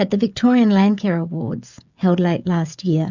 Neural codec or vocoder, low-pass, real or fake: codec, 16 kHz, 16 kbps, FreqCodec, smaller model; 7.2 kHz; fake